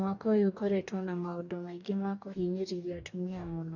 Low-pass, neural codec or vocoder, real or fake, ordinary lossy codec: 7.2 kHz; codec, 44.1 kHz, 2.6 kbps, DAC; fake; none